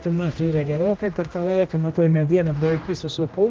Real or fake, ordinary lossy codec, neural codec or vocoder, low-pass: fake; Opus, 24 kbps; codec, 16 kHz, 1 kbps, X-Codec, HuBERT features, trained on general audio; 7.2 kHz